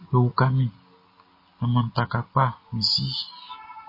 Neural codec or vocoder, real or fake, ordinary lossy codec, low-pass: none; real; MP3, 24 kbps; 5.4 kHz